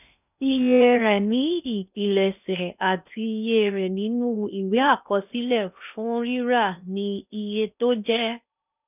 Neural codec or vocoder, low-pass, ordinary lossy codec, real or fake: codec, 16 kHz in and 24 kHz out, 0.6 kbps, FocalCodec, streaming, 2048 codes; 3.6 kHz; none; fake